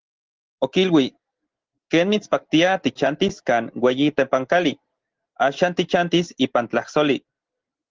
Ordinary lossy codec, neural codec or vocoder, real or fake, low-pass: Opus, 16 kbps; none; real; 7.2 kHz